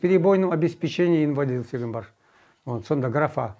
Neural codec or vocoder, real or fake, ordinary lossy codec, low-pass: none; real; none; none